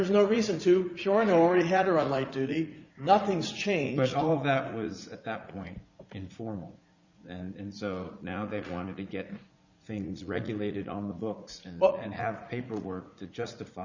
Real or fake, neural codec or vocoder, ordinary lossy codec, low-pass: fake; vocoder, 44.1 kHz, 80 mel bands, Vocos; Opus, 64 kbps; 7.2 kHz